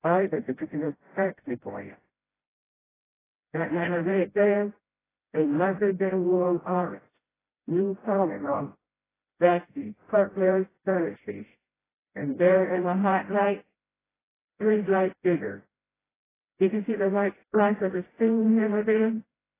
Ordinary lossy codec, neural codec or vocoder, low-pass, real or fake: AAC, 16 kbps; codec, 16 kHz, 0.5 kbps, FreqCodec, smaller model; 3.6 kHz; fake